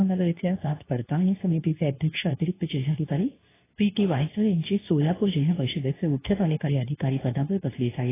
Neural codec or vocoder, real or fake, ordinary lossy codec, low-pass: codec, 24 kHz, 0.9 kbps, WavTokenizer, medium speech release version 2; fake; AAC, 16 kbps; 3.6 kHz